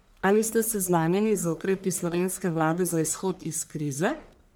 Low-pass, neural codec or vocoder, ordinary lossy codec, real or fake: none; codec, 44.1 kHz, 1.7 kbps, Pupu-Codec; none; fake